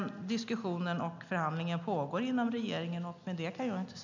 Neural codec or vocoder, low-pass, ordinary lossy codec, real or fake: none; 7.2 kHz; none; real